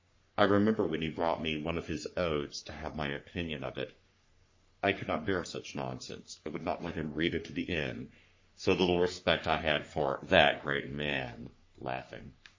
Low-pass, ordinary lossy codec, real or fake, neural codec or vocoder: 7.2 kHz; MP3, 32 kbps; fake; codec, 44.1 kHz, 3.4 kbps, Pupu-Codec